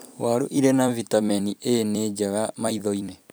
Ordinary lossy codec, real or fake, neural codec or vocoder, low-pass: none; fake; vocoder, 44.1 kHz, 128 mel bands every 256 samples, BigVGAN v2; none